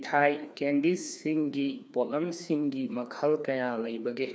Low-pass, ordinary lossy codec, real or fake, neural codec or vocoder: none; none; fake; codec, 16 kHz, 2 kbps, FreqCodec, larger model